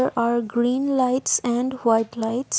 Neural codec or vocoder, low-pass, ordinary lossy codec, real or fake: none; none; none; real